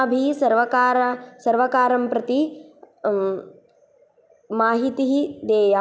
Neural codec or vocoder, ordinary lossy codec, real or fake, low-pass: none; none; real; none